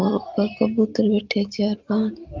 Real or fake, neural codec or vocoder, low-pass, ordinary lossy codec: real; none; 7.2 kHz; Opus, 32 kbps